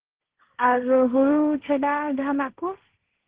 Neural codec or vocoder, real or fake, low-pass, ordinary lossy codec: codec, 16 kHz, 1.1 kbps, Voila-Tokenizer; fake; 3.6 kHz; Opus, 16 kbps